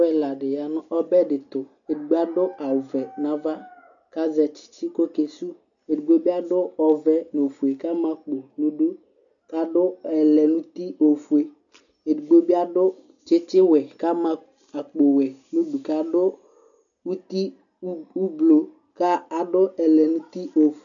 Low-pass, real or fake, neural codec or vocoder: 7.2 kHz; real; none